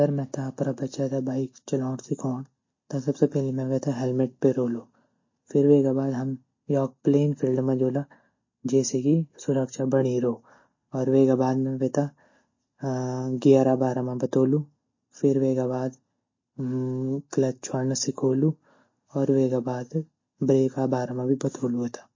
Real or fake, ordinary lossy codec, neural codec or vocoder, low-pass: real; MP3, 32 kbps; none; 7.2 kHz